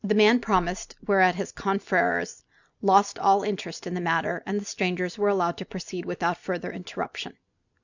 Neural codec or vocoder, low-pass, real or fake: none; 7.2 kHz; real